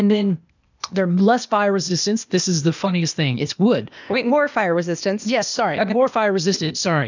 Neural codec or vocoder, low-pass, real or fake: codec, 16 kHz, 0.8 kbps, ZipCodec; 7.2 kHz; fake